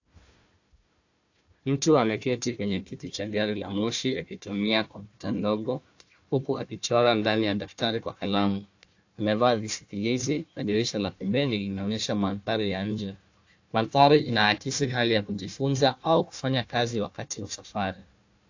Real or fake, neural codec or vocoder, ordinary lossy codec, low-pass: fake; codec, 16 kHz, 1 kbps, FunCodec, trained on Chinese and English, 50 frames a second; AAC, 48 kbps; 7.2 kHz